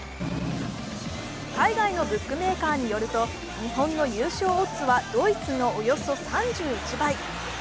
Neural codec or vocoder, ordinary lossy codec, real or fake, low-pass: none; none; real; none